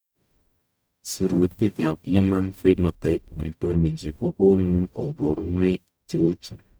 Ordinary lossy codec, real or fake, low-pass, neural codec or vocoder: none; fake; none; codec, 44.1 kHz, 0.9 kbps, DAC